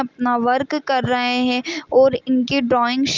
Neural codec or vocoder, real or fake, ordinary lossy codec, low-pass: none; real; Opus, 24 kbps; 7.2 kHz